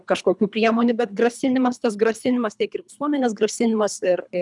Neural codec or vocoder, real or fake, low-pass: codec, 24 kHz, 3 kbps, HILCodec; fake; 10.8 kHz